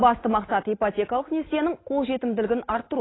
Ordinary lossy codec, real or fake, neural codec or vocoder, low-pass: AAC, 16 kbps; real; none; 7.2 kHz